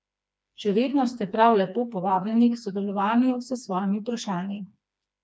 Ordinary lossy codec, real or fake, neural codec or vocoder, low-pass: none; fake; codec, 16 kHz, 2 kbps, FreqCodec, smaller model; none